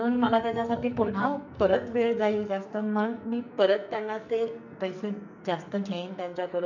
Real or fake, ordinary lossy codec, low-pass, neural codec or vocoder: fake; none; 7.2 kHz; codec, 44.1 kHz, 2.6 kbps, SNAC